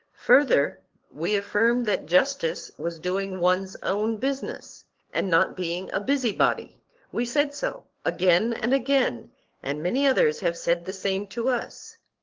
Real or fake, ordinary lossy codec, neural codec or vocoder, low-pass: fake; Opus, 16 kbps; codec, 44.1 kHz, 7.8 kbps, DAC; 7.2 kHz